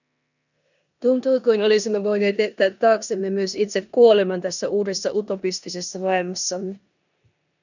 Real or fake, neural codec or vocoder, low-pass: fake; codec, 16 kHz in and 24 kHz out, 0.9 kbps, LongCat-Audio-Codec, four codebook decoder; 7.2 kHz